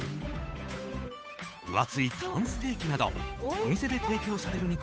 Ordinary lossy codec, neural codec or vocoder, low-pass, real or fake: none; codec, 16 kHz, 8 kbps, FunCodec, trained on Chinese and English, 25 frames a second; none; fake